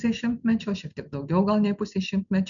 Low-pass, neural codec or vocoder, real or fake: 7.2 kHz; none; real